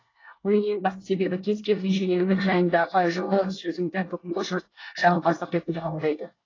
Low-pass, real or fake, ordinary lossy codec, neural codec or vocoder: 7.2 kHz; fake; AAC, 32 kbps; codec, 24 kHz, 1 kbps, SNAC